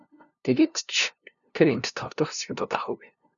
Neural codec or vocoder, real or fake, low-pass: codec, 16 kHz, 0.5 kbps, FunCodec, trained on LibriTTS, 25 frames a second; fake; 7.2 kHz